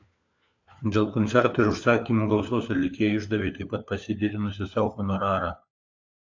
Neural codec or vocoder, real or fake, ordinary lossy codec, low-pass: codec, 16 kHz, 4 kbps, FunCodec, trained on LibriTTS, 50 frames a second; fake; AAC, 48 kbps; 7.2 kHz